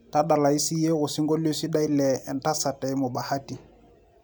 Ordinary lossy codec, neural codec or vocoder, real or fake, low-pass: none; none; real; none